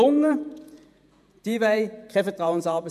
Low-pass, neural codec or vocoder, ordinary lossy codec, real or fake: 14.4 kHz; vocoder, 48 kHz, 128 mel bands, Vocos; MP3, 96 kbps; fake